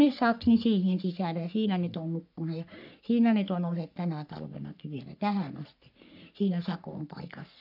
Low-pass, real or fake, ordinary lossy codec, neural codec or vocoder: 5.4 kHz; fake; none; codec, 44.1 kHz, 3.4 kbps, Pupu-Codec